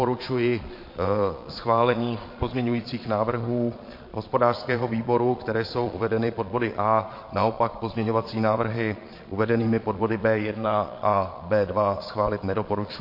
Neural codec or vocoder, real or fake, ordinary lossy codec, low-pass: vocoder, 22.05 kHz, 80 mel bands, Vocos; fake; MP3, 32 kbps; 5.4 kHz